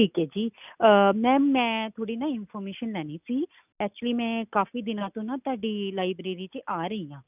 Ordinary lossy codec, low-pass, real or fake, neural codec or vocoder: none; 3.6 kHz; real; none